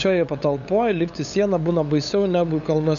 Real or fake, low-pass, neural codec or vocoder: fake; 7.2 kHz; codec, 16 kHz, 8 kbps, FunCodec, trained on LibriTTS, 25 frames a second